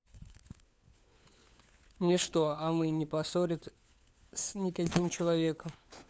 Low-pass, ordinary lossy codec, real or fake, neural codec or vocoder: none; none; fake; codec, 16 kHz, 4 kbps, FunCodec, trained on LibriTTS, 50 frames a second